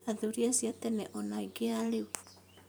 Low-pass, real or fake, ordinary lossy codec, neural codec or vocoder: none; fake; none; vocoder, 44.1 kHz, 128 mel bands every 256 samples, BigVGAN v2